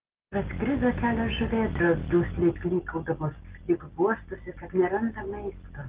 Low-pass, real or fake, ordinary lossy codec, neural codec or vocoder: 3.6 kHz; real; Opus, 16 kbps; none